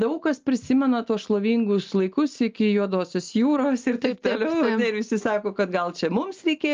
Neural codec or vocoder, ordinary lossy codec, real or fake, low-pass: none; Opus, 32 kbps; real; 7.2 kHz